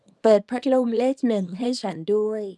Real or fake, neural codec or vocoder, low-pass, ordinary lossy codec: fake; codec, 24 kHz, 0.9 kbps, WavTokenizer, small release; none; none